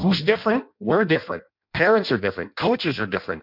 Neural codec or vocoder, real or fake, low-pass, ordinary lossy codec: codec, 16 kHz in and 24 kHz out, 0.6 kbps, FireRedTTS-2 codec; fake; 5.4 kHz; MP3, 48 kbps